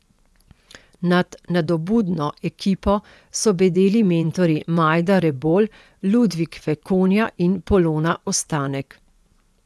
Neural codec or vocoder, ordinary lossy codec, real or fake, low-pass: vocoder, 24 kHz, 100 mel bands, Vocos; none; fake; none